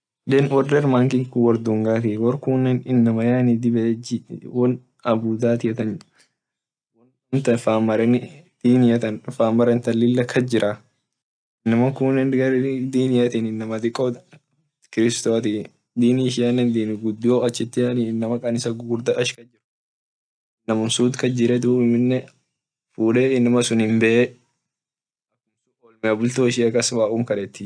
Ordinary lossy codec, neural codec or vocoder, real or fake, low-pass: none; none; real; 9.9 kHz